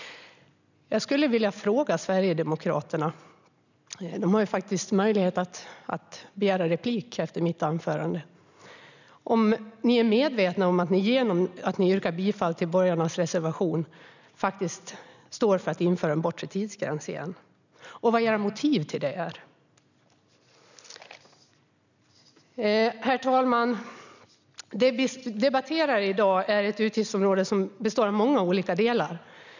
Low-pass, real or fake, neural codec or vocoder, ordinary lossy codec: 7.2 kHz; real; none; none